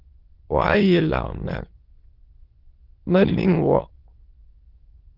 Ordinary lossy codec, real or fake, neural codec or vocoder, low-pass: Opus, 16 kbps; fake; autoencoder, 22.05 kHz, a latent of 192 numbers a frame, VITS, trained on many speakers; 5.4 kHz